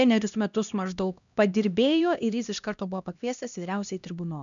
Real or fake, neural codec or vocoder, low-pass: fake; codec, 16 kHz, 1 kbps, X-Codec, HuBERT features, trained on LibriSpeech; 7.2 kHz